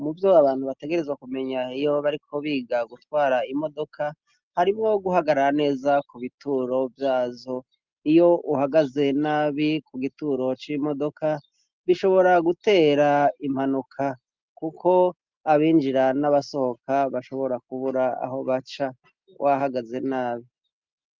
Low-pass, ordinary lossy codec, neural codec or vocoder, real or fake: 7.2 kHz; Opus, 24 kbps; none; real